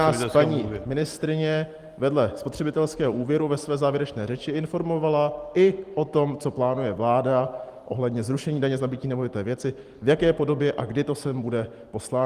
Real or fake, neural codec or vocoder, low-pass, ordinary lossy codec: real; none; 14.4 kHz; Opus, 24 kbps